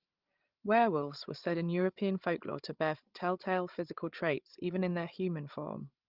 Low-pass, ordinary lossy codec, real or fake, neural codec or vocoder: 5.4 kHz; Opus, 32 kbps; fake; codec, 16 kHz, 16 kbps, FreqCodec, larger model